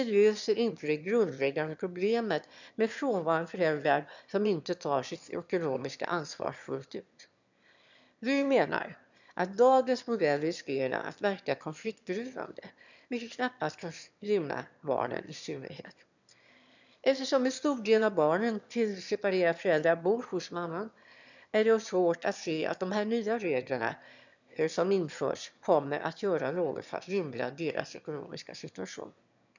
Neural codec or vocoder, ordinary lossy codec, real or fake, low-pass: autoencoder, 22.05 kHz, a latent of 192 numbers a frame, VITS, trained on one speaker; none; fake; 7.2 kHz